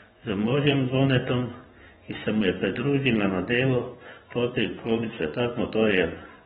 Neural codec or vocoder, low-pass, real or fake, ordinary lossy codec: vocoder, 24 kHz, 100 mel bands, Vocos; 10.8 kHz; fake; AAC, 16 kbps